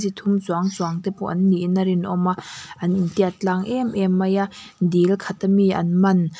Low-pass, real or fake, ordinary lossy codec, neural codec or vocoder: none; real; none; none